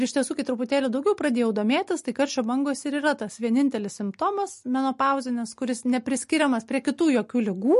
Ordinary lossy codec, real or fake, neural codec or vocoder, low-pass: MP3, 48 kbps; real; none; 14.4 kHz